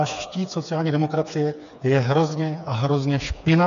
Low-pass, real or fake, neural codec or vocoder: 7.2 kHz; fake; codec, 16 kHz, 4 kbps, FreqCodec, smaller model